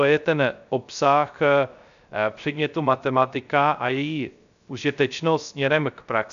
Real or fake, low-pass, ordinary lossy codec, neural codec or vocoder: fake; 7.2 kHz; AAC, 96 kbps; codec, 16 kHz, 0.3 kbps, FocalCodec